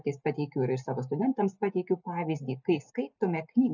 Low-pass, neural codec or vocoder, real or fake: 7.2 kHz; none; real